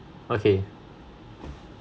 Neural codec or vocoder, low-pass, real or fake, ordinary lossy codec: none; none; real; none